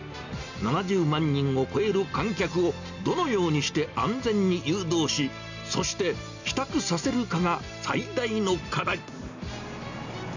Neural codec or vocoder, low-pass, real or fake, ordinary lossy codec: none; 7.2 kHz; real; none